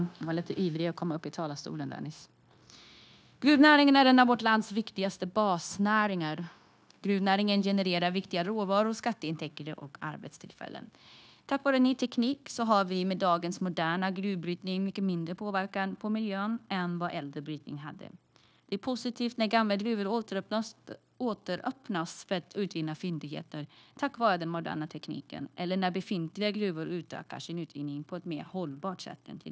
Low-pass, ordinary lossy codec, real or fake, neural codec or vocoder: none; none; fake; codec, 16 kHz, 0.9 kbps, LongCat-Audio-Codec